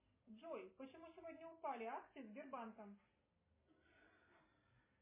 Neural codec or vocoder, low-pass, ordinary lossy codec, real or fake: none; 3.6 kHz; MP3, 16 kbps; real